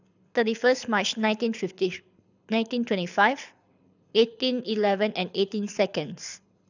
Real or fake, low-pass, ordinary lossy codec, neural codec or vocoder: fake; 7.2 kHz; none; codec, 24 kHz, 6 kbps, HILCodec